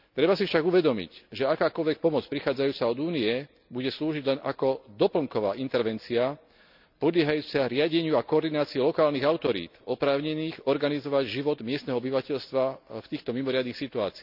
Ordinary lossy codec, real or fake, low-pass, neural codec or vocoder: none; real; 5.4 kHz; none